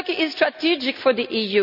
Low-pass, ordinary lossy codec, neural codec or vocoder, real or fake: 5.4 kHz; none; none; real